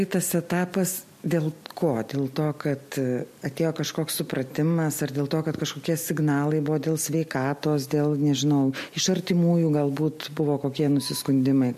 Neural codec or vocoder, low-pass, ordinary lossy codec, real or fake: none; 14.4 kHz; MP3, 64 kbps; real